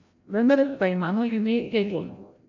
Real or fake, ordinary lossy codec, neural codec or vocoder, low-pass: fake; AAC, 48 kbps; codec, 16 kHz, 0.5 kbps, FreqCodec, larger model; 7.2 kHz